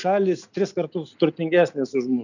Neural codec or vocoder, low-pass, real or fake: none; 7.2 kHz; real